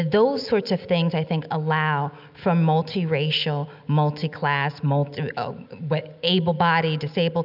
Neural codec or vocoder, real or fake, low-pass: none; real; 5.4 kHz